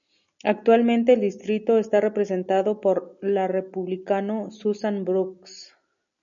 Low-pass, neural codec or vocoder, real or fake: 7.2 kHz; none; real